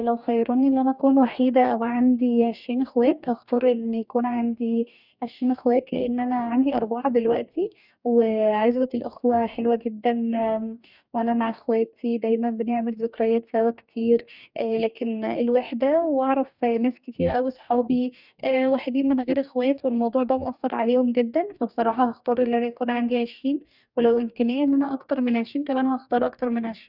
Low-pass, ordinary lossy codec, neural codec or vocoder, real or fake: 5.4 kHz; none; codec, 44.1 kHz, 2.6 kbps, DAC; fake